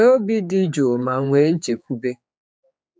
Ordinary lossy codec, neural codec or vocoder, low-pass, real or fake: none; codec, 16 kHz, 4 kbps, X-Codec, HuBERT features, trained on general audio; none; fake